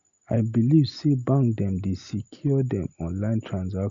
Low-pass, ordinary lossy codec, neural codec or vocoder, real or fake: 7.2 kHz; Opus, 64 kbps; none; real